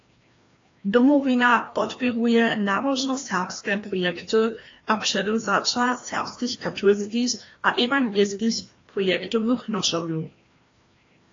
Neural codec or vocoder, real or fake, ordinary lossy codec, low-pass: codec, 16 kHz, 1 kbps, FreqCodec, larger model; fake; AAC, 32 kbps; 7.2 kHz